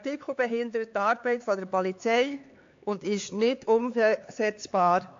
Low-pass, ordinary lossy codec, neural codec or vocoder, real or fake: 7.2 kHz; AAC, 48 kbps; codec, 16 kHz, 4 kbps, X-Codec, HuBERT features, trained on LibriSpeech; fake